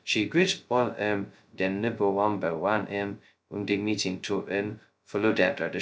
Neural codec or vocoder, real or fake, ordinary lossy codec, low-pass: codec, 16 kHz, 0.2 kbps, FocalCodec; fake; none; none